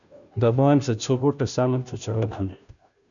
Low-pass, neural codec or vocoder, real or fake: 7.2 kHz; codec, 16 kHz, 0.5 kbps, FunCodec, trained on Chinese and English, 25 frames a second; fake